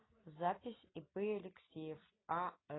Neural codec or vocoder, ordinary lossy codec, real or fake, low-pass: none; AAC, 16 kbps; real; 7.2 kHz